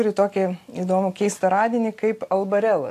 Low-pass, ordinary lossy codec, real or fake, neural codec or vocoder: 14.4 kHz; AAC, 64 kbps; real; none